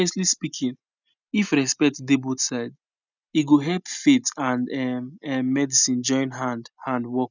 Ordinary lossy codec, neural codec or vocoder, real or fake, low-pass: none; none; real; 7.2 kHz